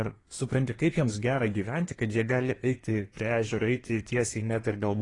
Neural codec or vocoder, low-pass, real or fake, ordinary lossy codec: codec, 24 kHz, 1 kbps, SNAC; 10.8 kHz; fake; AAC, 32 kbps